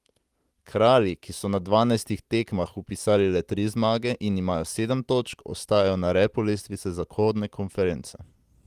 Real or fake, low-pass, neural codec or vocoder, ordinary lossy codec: real; 14.4 kHz; none; Opus, 24 kbps